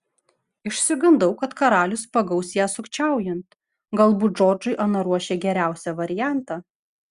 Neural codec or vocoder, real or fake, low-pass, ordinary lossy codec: none; real; 10.8 kHz; Opus, 64 kbps